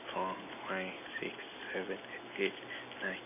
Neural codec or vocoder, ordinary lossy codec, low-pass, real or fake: none; none; 3.6 kHz; real